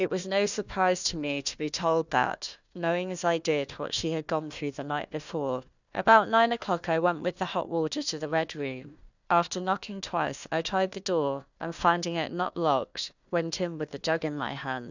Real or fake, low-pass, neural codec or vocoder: fake; 7.2 kHz; codec, 16 kHz, 1 kbps, FunCodec, trained on Chinese and English, 50 frames a second